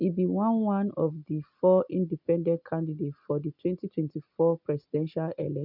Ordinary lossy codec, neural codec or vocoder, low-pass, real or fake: none; none; 5.4 kHz; real